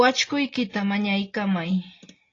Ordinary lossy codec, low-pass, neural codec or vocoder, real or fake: AAC, 32 kbps; 7.2 kHz; none; real